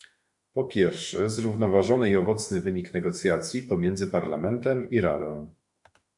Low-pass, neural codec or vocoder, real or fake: 10.8 kHz; autoencoder, 48 kHz, 32 numbers a frame, DAC-VAE, trained on Japanese speech; fake